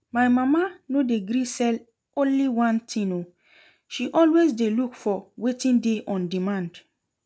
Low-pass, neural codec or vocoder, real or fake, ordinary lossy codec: none; none; real; none